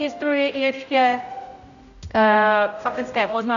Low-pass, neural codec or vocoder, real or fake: 7.2 kHz; codec, 16 kHz, 0.5 kbps, X-Codec, HuBERT features, trained on general audio; fake